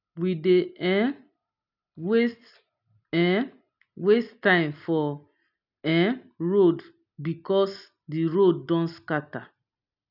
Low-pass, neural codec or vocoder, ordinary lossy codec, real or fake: 5.4 kHz; none; none; real